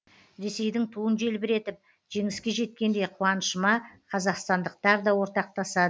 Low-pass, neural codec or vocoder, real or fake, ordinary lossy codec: none; none; real; none